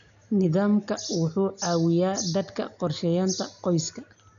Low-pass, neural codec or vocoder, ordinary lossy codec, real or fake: 7.2 kHz; none; none; real